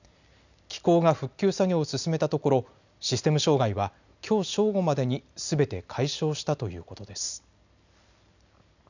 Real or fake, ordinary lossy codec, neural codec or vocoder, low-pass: real; none; none; 7.2 kHz